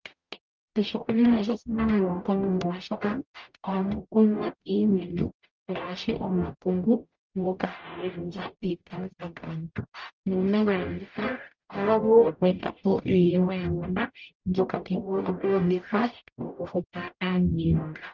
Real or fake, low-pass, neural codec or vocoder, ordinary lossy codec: fake; 7.2 kHz; codec, 44.1 kHz, 0.9 kbps, DAC; Opus, 24 kbps